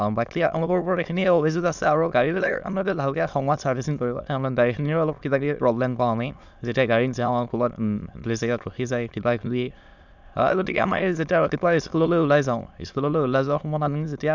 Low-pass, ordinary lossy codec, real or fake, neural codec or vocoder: 7.2 kHz; none; fake; autoencoder, 22.05 kHz, a latent of 192 numbers a frame, VITS, trained on many speakers